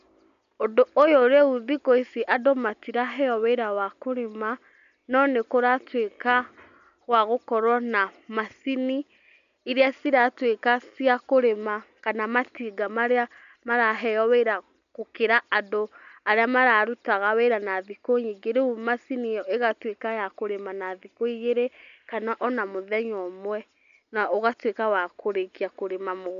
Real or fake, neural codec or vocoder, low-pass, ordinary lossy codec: real; none; 7.2 kHz; none